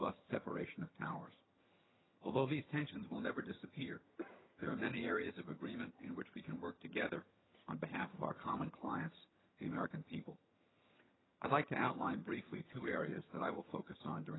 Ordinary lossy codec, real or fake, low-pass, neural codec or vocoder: AAC, 16 kbps; fake; 7.2 kHz; vocoder, 22.05 kHz, 80 mel bands, HiFi-GAN